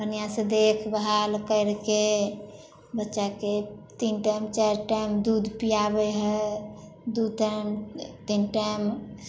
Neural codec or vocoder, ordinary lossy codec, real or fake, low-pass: none; none; real; none